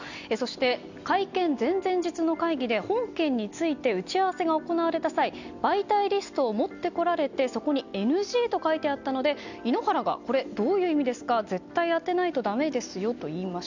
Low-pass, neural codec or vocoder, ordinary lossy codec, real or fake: 7.2 kHz; none; none; real